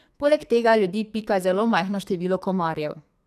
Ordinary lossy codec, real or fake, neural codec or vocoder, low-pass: none; fake; codec, 44.1 kHz, 2.6 kbps, SNAC; 14.4 kHz